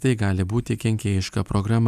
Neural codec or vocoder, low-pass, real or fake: none; 14.4 kHz; real